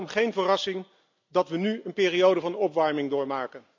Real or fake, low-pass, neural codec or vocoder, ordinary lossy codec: real; 7.2 kHz; none; MP3, 64 kbps